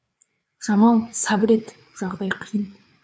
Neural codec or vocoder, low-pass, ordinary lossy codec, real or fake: codec, 16 kHz, 4 kbps, FreqCodec, larger model; none; none; fake